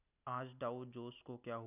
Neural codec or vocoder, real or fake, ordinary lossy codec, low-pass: none; real; none; 3.6 kHz